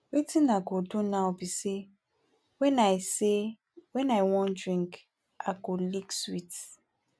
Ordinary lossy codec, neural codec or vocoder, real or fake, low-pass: none; none; real; none